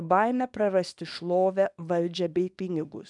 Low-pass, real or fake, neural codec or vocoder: 10.8 kHz; fake; codec, 24 kHz, 0.9 kbps, WavTokenizer, small release